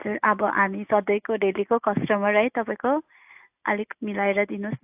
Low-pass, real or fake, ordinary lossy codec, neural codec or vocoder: 3.6 kHz; real; AAC, 32 kbps; none